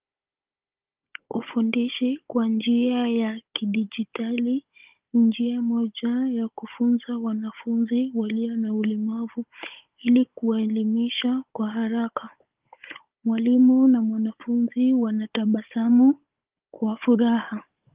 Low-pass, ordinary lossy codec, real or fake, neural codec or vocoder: 3.6 kHz; Opus, 32 kbps; fake; codec, 16 kHz, 16 kbps, FunCodec, trained on Chinese and English, 50 frames a second